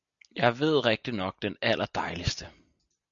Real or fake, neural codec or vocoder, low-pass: real; none; 7.2 kHz